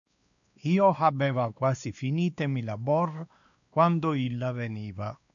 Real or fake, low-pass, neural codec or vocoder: fake; 7.2 kHz; codec, 16 kHz, 2 kbps, X-Codec, WavLM features, trained on Multilingual LibriSpeech